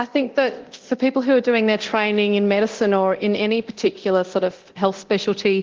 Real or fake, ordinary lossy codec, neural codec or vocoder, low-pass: fake; Opus, 16 kbps; codec, 24 kHz, 0.9 kbps, DualCodec; 7.2 kHz